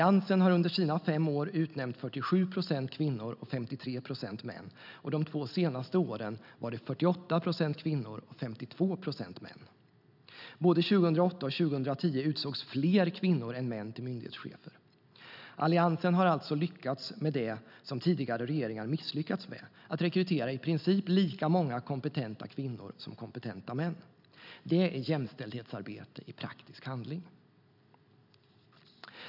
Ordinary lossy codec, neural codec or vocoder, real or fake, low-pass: none; none; real; 5.4 kHz